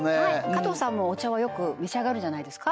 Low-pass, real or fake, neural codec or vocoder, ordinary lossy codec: none; real; none; none